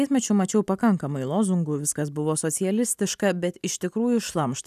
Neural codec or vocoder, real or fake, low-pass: none; real; 14.4 kHz